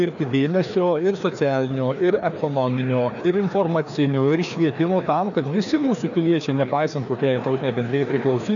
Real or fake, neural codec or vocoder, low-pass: fake; codec, 16 kHz, 2 kbps, FreqCodec, larger model; 7.2 kHz